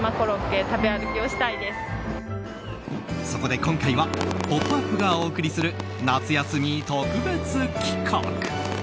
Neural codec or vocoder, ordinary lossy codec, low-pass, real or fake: none; none; none; real